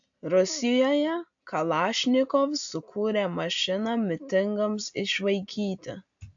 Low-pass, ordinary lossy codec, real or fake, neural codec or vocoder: 7.2 kHz; AAC, 64 kbps; real; none